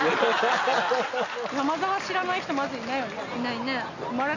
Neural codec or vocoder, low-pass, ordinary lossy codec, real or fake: none; 7.2 kHz; none; real